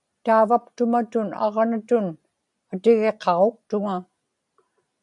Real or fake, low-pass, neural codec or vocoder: real; 10.8 kHz; none